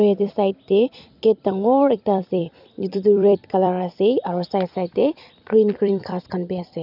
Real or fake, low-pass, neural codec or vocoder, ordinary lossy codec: fake; 5.4 kHz; vocoder, 44.1 kHz, 128 mel bands every 256 samples, BigVGAN v2; none